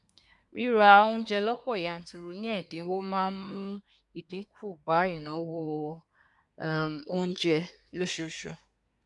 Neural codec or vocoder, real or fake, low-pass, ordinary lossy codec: codec, 24 kHz, 1 kbps, SNAC; fake; 10.8 kHz; none